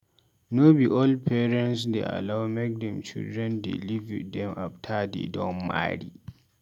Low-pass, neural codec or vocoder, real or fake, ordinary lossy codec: 19.8 kHz; none; real; none